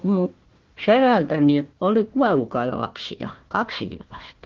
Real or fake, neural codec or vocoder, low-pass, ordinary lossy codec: fake; codec, 16 kHz, 1 kbps, FunCodec, trained on Chinese and English, 50 frames a second; 7.2 kHz; Opus, 16 kbps